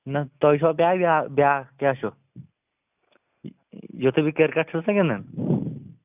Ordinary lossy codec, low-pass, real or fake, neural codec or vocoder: none; 3.6 kHz; real; none